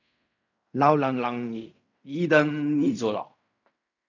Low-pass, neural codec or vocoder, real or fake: 7.2 kHz; codec, 16 kHz in and 24 kHz out, 0.4 kbps, LongCat-Audio-Codec, fine tuned four codebook decoder; fake